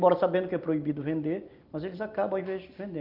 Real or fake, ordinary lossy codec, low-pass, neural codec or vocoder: real; Opus, 24 kbps; 5.4 kHz; none